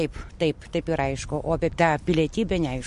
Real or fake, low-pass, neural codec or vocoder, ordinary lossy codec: real; 14.4 kHz; none; MP3, 48 kbps